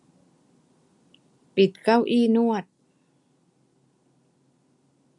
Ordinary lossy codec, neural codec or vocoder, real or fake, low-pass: MP3, 64 kbps; none; real; 10.8 kHz